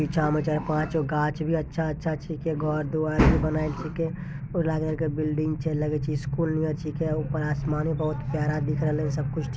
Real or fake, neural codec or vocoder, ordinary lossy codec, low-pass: real; none; none; none